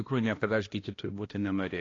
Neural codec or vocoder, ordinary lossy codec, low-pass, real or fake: codec, 16 kHz, 1 kbps, X-Codec, HuBERT features, trained on balanced general audio; AAC, 32 kbps; 7.2 kHz; fake